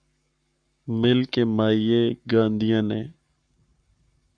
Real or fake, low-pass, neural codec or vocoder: fake; 9.9 kHz; codec, 44.1 kHz, 7.8 kbps, Pupu-Codec